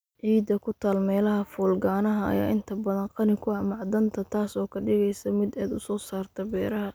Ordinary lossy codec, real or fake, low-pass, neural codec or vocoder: none; real; none; none